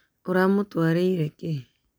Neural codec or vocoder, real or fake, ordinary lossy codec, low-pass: none; real; none; none